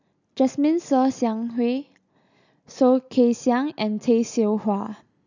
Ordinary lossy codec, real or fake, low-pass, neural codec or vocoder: none; real; 7.2 kHz; none